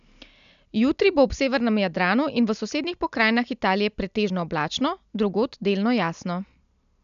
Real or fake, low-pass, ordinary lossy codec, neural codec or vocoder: real; 7.2 kHz; none; none